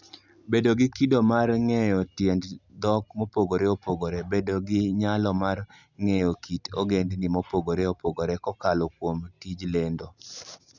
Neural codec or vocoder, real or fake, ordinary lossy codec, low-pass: none; real; none; 7.2 kHz